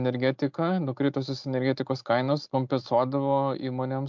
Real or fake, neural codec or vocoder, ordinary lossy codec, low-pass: real; none; MP3, 64 kbps; 7.2 kHz